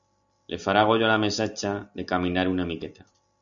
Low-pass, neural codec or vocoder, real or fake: 7.2 kHz; none; real